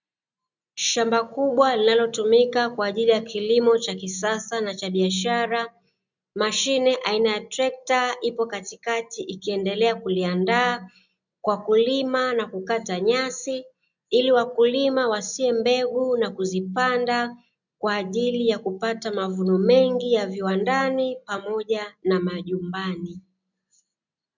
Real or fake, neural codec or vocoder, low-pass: real; none; 7.2 kHz